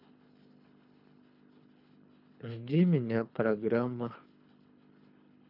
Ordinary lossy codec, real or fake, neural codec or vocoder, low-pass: none; fake; codec, 24 kHz, 3 kbps, HILCodec; 5.4 kHz